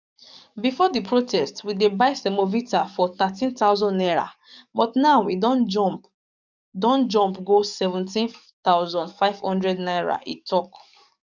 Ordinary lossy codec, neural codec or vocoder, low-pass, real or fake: none; codec, 44.1 kHz, 7.8 kbps, DAC; 7.2 kHz; fake